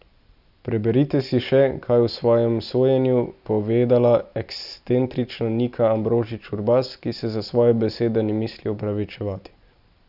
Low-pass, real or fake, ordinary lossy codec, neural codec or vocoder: 5.4 kHz; real; none; none